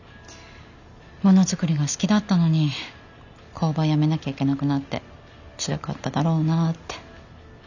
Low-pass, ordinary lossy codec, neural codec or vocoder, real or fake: 7.2 kHz; none; none; real